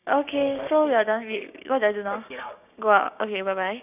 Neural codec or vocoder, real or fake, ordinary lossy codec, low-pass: codec, 16 kHz, 6 kbps, DAC; fake; none; 3.6 kHz